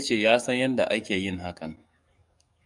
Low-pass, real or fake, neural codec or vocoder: 10.8 kHz; fake; codec, 44.1 kHz, 7.8 kbps, DAC